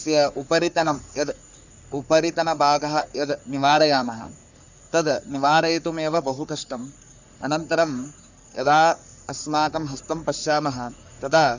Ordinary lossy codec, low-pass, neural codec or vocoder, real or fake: none; 7.2 kHz; codec, 44.1 kHz, 3.4 kbps, Pupu-Codec; fake